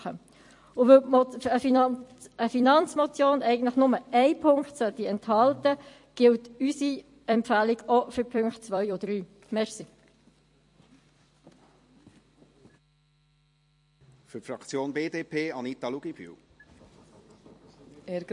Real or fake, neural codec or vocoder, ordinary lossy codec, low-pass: fake; vocoder, 44.1 kHz, 128 mel bands every 256 samples, BigVGAN v2; MP3, 48 kbps; 10.8 kHz